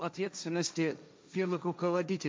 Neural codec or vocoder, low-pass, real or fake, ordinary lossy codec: codec, 16 kHz, 1.1 kbps, Voila-Tokenizer; none; fake; none